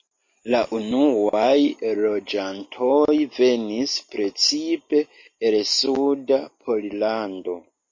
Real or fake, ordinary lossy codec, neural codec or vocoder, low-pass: real; MP3, 32 kbps; none; 7.2 kHz